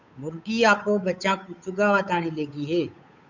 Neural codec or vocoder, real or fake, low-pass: codec, 16 kHz, 8 kbps, FunCodec, trained on Chinese and English, 25 frames a second; fake; 7.2 kHz